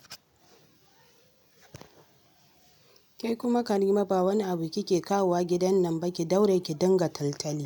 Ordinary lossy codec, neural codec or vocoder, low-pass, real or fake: none; none; 19.8 kHz; real